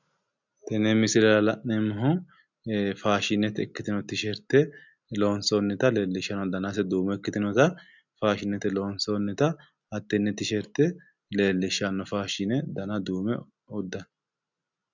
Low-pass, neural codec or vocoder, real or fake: 7.2 kHz; none; real